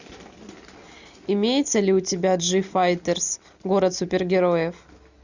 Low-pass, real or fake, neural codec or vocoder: 7.2 kHz; real; none